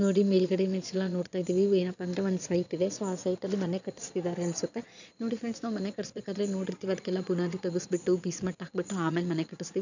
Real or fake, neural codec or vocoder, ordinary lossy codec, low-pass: fake; vocoder, 22.05 kHz, 80 mel bands, Vocos; none; 7.2 kHz